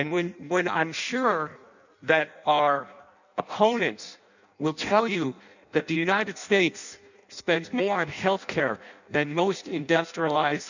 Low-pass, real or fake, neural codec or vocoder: 7.2 kHz; fake; codec, 16 kHz in and 24 kHz out, 0.6 kbps, FireRedTTS-2 codec